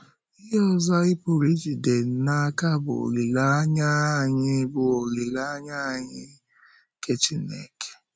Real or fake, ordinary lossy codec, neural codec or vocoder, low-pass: real; none; none; none